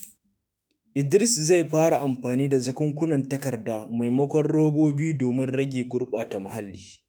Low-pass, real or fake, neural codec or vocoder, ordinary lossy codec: none; fake; autoencoder, 48 kHz, 32 numbers a frame, DAC-VAE, trained on Japanese speech; none